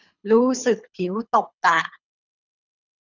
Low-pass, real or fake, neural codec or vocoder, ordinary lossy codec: 7.2 kHz; fake; codec, 24 kHz, 3 kbps, HILCodec; none